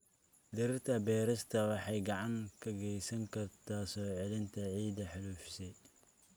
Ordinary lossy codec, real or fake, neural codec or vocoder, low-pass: none; real; none; none